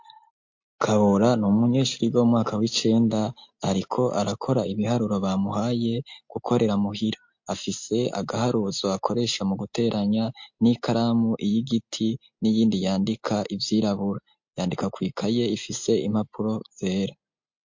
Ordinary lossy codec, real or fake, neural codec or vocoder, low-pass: MP3, 48 kbps; real; none; 7.2 kHz